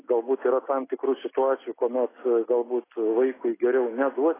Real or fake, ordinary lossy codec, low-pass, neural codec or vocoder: real; AAC, 16 kbps; 3.6 kHz; none